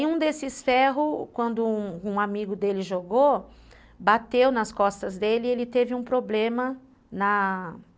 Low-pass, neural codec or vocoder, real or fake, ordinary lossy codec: none; none; real; none